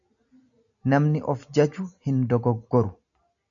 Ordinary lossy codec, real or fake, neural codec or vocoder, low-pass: MP3, 96 kbps; real; none; 7.2 kHz